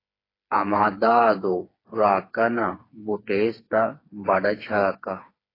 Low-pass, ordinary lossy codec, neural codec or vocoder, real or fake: 5.4 kHz; AAC, 24 kbps; codec, 16 kHz, 4 kbps, FreqCodec, smaller model; fake